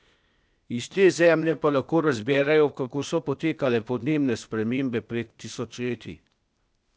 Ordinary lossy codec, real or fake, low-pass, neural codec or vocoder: none; fake; none; codec, 16 kHz, 0.8 kbps, ZipCodec